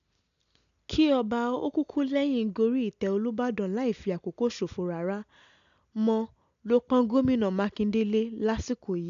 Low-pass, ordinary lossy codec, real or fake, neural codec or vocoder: 7.2 kHz; none; real; none